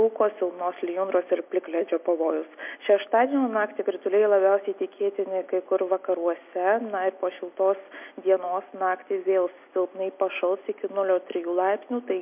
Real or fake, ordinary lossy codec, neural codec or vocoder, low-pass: real; MP3, 32 kbps; none; 3.6 kHz